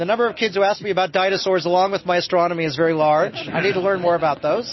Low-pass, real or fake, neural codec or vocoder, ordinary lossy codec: 7.2 kHz; real; none; MP3, 24 kbps